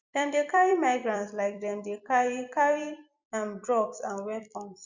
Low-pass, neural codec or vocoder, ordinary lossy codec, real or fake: 7.2 kHz; vocoder, 44.1 kHz, 128 mel bands every 256 samples, BigVGAN v2; Opus, 64 kbps; fake